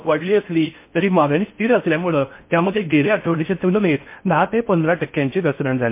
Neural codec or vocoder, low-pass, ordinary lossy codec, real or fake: codec, 16 kHz in and 24 kHz out, 0.6 kbps, FocalCodec, streaming, 4096 codes; 3.6 kHz; MP3, 24 kbps; fake